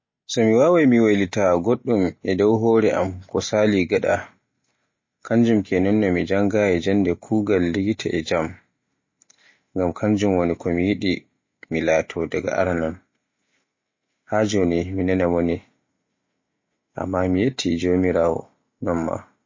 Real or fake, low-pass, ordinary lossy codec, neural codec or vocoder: real; 7.2 kHz; MP3, 32 kbps; none